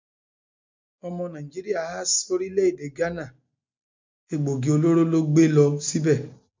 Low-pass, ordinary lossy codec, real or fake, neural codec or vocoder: 7.2 kHz; MP3, 64 kbps; real; none